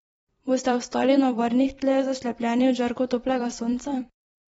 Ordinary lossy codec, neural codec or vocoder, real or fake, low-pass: AAC, 24 kbps; vocoder, 44.1 kHz, 128 mel bands every 256 samples, BigVGAN v2; fake; 19.8 kHz